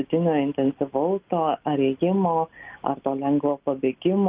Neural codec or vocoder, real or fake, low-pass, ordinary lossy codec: none; real; 3.6 kHz; Opus, 32 kbps